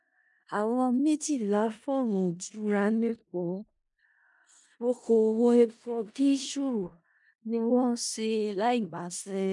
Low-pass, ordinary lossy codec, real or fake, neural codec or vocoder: 10.8 kHz; MP3, 96 kbps; fake; codec, 16 kHz in and 24 kHz out, 0.4 kbps, LongCat-Audio-Codec, four codebook decoder